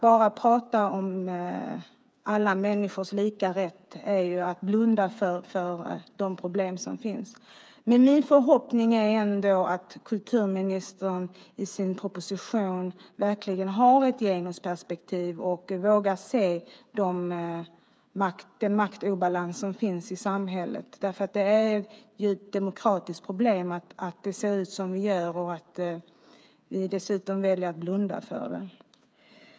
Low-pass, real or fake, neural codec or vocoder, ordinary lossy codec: none; fake; codec, 16 kHz, 8 kbps, FreqCodec, smaller model; none